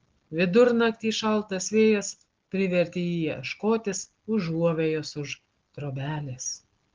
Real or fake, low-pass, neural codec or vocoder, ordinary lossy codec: real; 7.2 kHz; none; Opus, 16 kbps